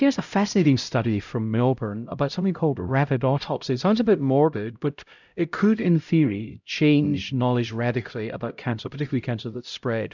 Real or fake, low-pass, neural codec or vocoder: fake; 7.2 kHz; codec, 16 kHz, 0.5 kbps, X-Codec, HuBERT features, trained on LibriSpeech